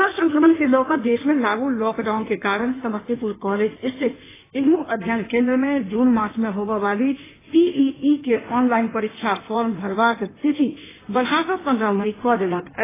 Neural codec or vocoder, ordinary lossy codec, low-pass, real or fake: codec, 16 kHz in and 24 kHz out, 1.1 kbps, FireRedTTS-2 codec; AAC, 16 kbps; 3.6 kHz; fake